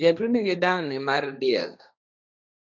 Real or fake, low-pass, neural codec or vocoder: fake; 7.2 kHz; codec, 16 kHz, 1.1 kbps, Voila-Tokenizer